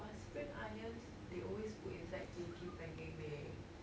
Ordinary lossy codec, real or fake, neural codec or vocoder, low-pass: none; real; none; none